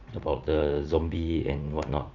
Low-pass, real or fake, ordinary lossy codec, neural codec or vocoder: 7.2 kHz; fake; none; vocoder, 44.1 kHz, 80 mel bands, Vocos